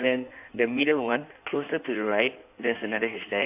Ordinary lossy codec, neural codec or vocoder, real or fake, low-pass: none; codec, 16 kHz in and 24 kHz out, 1.1 kbps, FireRedTTS-2 codec; fake; 3.6 kHz